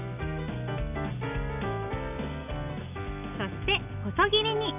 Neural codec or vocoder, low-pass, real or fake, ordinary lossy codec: none; 3.6 kHz; real; none